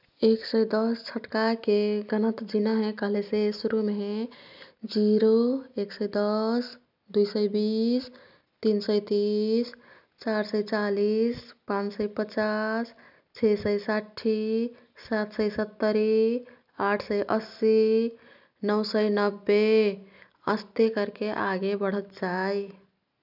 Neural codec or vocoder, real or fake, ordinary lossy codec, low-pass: none; real; none; 5.4 kHz